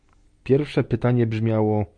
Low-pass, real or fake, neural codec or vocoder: 9.9 kHz; real; none